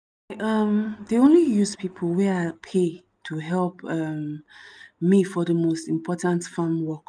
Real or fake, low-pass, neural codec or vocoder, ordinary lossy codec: real; 9.9 kHz; none; none